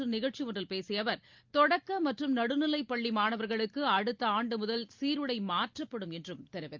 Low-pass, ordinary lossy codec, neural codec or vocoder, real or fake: 7.2 kHz; Opus, 32 kbps; none; real